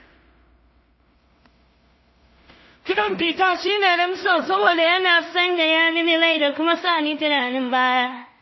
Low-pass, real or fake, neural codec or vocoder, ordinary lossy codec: 7.2 kHz; fake; codec, 16 kHz in and 24 kHz out, 0.4 kbps, LongCat-Audio-Codec, two codebook decoder; MP3, 24 kbps